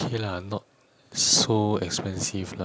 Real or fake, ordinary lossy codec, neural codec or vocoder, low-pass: real; none; none; none